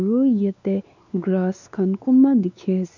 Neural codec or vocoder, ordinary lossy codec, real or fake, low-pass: codec, 16 kHz, 2 kbps, X-Codec, WavLM features, trained on Multilingual LibriSpeech; none; fake; 7.2 kHz